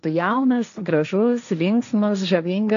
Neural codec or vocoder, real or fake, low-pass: codec, 16 kHz, 1.1 kbps, Voila-Tokenizer; fake; 7.2 kHz